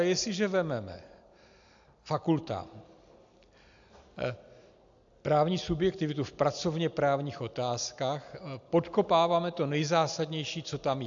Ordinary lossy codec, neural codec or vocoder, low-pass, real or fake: AAC, 64 kbps; none; 7.2 kHz; real